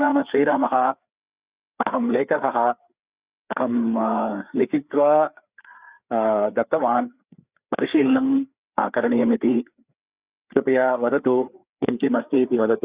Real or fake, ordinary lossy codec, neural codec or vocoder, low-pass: fake; Opus, 64 kbps; codec, 16 kHz, 4 kbps, FreqCodec, larger model; 3.6 kHz